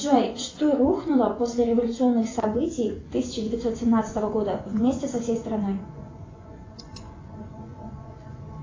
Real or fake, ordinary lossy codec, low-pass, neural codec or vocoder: fake; AAC, 32 kbps; 7.2 kHz; vocoder, 24 kHz, 100 mel bands, Vocos